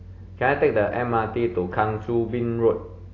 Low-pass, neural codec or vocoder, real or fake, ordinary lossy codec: 7.2 kHz; none; real; AAC, 32 kbps